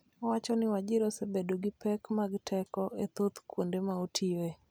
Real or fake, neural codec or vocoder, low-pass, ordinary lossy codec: real; none; none; none